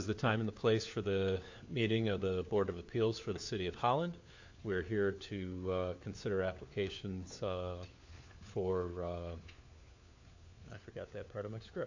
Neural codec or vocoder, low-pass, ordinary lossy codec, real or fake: codec, 16 kHz, 4 kbps, FunCodec, trained on LibriTTS, 50 frames a second; 7.2 kHz; AAC, 48 kbps; fake